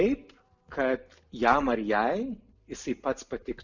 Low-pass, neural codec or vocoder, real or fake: 7.2 kHz; none; real